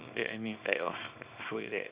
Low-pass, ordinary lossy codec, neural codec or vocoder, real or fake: 3.6 kHz; Opus, 64 kbps; codec, 24 kHz, 0.9 kbps, WavTokenizer, small release; fake